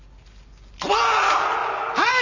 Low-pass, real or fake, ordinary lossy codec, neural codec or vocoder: 7.2 kHz; real; none; none